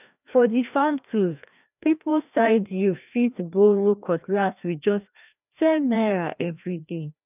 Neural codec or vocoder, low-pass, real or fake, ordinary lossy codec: codec, 16 kHz, 1 kbps, FreqCodec, larger model; 3.6 kHz; fake; none